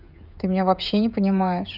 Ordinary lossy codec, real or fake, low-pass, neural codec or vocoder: none; fake; 5.4 kHz; codec, 16 kHz, 16 kbps, FreqCodec, smaller model